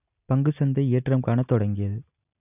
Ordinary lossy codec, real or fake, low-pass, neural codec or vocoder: none; real; 3.6 kHz; none